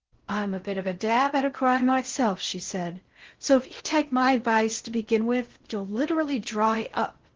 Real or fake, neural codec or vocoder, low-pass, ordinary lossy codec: fake; codec, 16 kHz in and 24 kHz out, 0.6 kbps, FocalCodec, streaming, 4096 codes; 7.2 kHz; Opus, 16 kbps